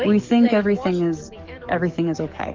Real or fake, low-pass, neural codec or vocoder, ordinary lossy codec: fake; 7.2 kHz; codec, 44.1 kHz, 7.8 kbps, DAC; Opus, 32 kbps